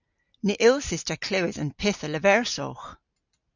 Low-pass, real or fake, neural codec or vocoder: 7.2 kHz; real; none